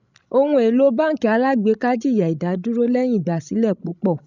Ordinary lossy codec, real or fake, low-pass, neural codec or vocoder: none; fake; 7.2 kHz; codec, 16 kHz, 16 kbps, FreqCodec, larger model